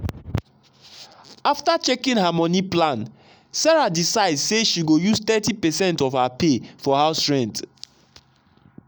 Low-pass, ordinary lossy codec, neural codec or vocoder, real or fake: none; none; none; real